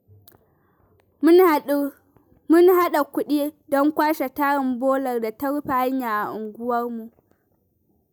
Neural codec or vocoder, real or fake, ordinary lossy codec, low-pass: none; real; none; none